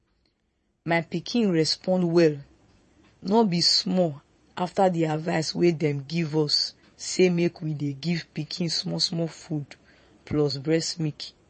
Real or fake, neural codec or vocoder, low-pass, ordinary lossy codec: fake; vocoder, 22.05 kHz, 80 mel bands, Vocos; 9.9 kHz; MP3, 32 kbps